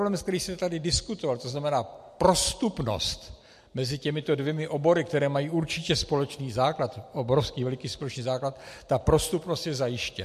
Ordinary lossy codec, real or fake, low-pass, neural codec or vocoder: MP3, 64 kbps; real; 14.4 kHz; none